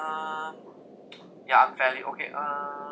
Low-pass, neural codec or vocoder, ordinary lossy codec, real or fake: none; none; none; real